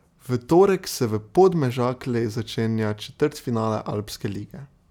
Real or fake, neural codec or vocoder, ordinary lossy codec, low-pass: real; none; none; 19.8 kHz